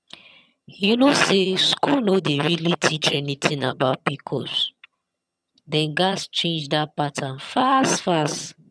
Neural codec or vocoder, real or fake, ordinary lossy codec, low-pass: vocoder, 22.05 kHz, 80 mel bands, HiFi-GAN; fake; none; none